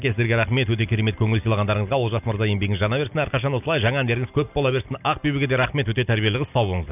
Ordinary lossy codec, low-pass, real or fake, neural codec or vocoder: none; 3.6 kHz; real; none